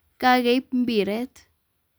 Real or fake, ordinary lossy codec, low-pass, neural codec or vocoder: real; none; none; none